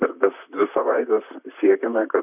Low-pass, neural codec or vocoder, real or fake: 3.6 kHz; vocoder, 44.1 kHz, 128 mel bands, Pupu-Vocoder; fake